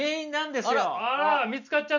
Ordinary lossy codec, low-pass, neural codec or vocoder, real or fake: none; 7.2 kHz; none; real